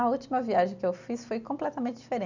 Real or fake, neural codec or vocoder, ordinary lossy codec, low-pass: real; none; none; 7.2 kHz